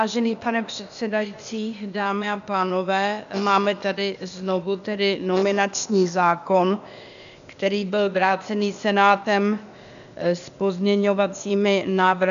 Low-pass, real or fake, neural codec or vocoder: 7.2 kHz; fake; codec, 16 kHz, 0.8 kbps, ZipCodec